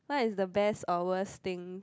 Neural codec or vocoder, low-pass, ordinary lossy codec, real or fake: none; none; none; real